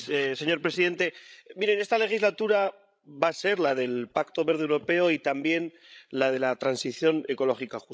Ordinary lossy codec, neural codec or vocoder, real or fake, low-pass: none; codec, 16 kHz, 16 kbps, FreqCodec, larger model; fake; none